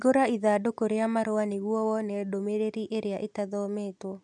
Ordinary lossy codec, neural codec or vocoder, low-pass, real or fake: none; none; 10.8 kHz; real